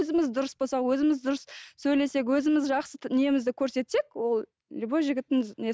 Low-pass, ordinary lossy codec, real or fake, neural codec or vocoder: none; none; real; none